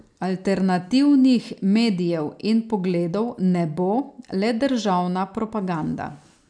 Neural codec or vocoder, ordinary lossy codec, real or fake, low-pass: none; none; real; 9.9 kHz